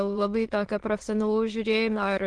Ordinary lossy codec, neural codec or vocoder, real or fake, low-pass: Opus, 16 kbps; autoencoder, 22.05 kHz, a latent of 192 numbers a frame, VITS, trained on many speakers; fake; 9.9 kHz